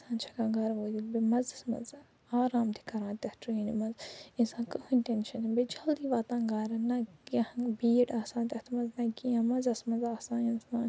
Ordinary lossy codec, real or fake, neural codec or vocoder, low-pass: none; real; none; none